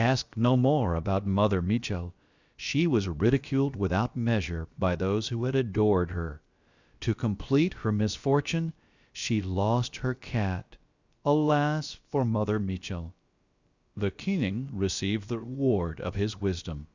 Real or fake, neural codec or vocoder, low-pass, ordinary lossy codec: fake; codec, 16 kHz, about 1 kbps, DyCAST, with the encoder's durations; 7.2 kHz; Opus, 64 kbps